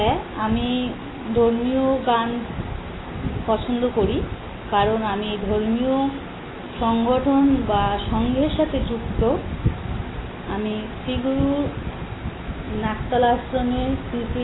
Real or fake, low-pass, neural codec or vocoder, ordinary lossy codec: real; 7.2 kHz; none; AAC, 16 kbps